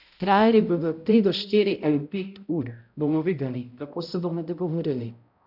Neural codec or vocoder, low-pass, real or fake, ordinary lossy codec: codec, 16 kHz, 0.5 kbps, X-Codec, HuBERT features, trained on balanced general audio; 5.4 kHz; fake; none